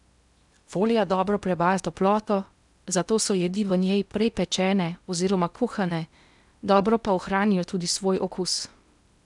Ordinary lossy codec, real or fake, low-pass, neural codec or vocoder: none; fake; 10.8 kHz; codec, 16 kHz in and 24 kHz out, 0.8 kbps, FocalCodec, streaming, 65536 codes